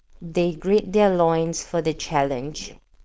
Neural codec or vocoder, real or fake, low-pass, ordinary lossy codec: codec, 16 kHz, 4.8 kbps, FACodec; fake; none; none